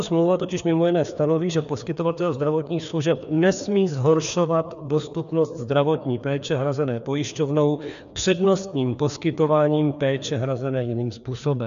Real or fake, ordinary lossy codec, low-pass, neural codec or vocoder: fake; MP3, 96 kbps; 7.2 kHz; codec, 16 kHz, 2 kbps, FreqCodec, larger model